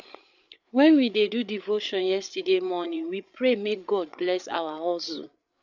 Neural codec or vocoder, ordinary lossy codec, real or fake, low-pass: codec, 16 kHz, 8 kbps, FreqCodec, larger model; none; fake; 7.2 kHz